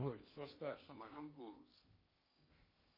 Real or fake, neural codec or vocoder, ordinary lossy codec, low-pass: fake; codec, 16 kHz in and 24 kHz out, 0.8 kbps, FocalCodec, streaming, 65536 codes; MP3, 24 kbps; 5.4 kHz